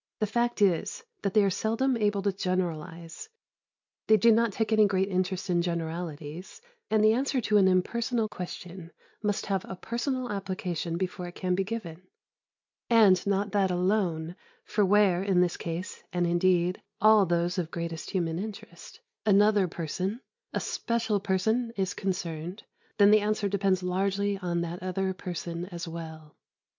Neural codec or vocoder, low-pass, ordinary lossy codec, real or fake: none; 7.2 kHz; MP3, 64 kbps; real